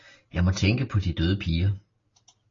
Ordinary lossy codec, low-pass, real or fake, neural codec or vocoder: AAC, 32 kbps; 7.2 kHz; real; none